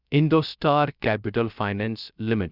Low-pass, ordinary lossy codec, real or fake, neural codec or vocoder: 5.4 kHz; none; fake; codec, 16 kHz, 0.3 kbps, FocalCodec